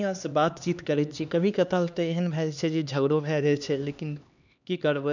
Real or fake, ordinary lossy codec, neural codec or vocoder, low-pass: fake; none; codec, 16 kHz, 2 kbps, X-Codec, HuBERT features, trained on LibriSpeech; 7.2 kHz